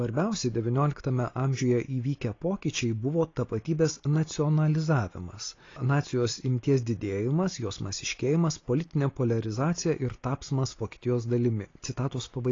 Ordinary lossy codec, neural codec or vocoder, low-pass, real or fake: AAC, 32 kbps; none; 7.2 kHz; real